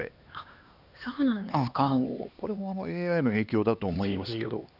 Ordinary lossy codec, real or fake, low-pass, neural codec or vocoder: none; fake; 5.4 kHz; codec, 16 kHz, 2 kbps, X-Codec, HuBERT features, trained on LibriSpeech